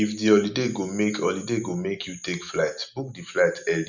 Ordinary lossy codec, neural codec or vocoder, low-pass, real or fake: none; none; 7.2 kHz; real